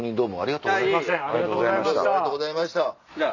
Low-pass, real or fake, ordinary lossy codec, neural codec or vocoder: 7.2 kHz; real; none; none